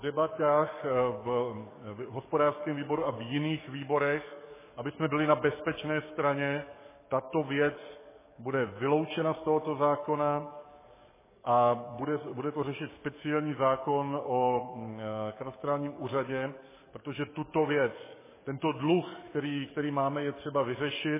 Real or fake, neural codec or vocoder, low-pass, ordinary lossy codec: fake; codec, 44.1 kHz, 7.8 kbps, DAC; 3.6 kHz; MP3, 16 kbps